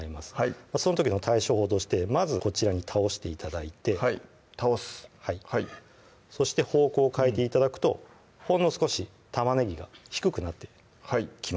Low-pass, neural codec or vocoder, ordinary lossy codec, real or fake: none; none; none; real